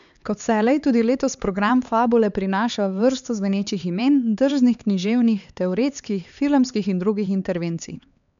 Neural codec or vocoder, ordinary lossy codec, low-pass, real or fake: codec, 16 kHz, 4 kbps, X-Codec, HuBERT features, trained on LibriSpeech; none; 7.2 kHz; fake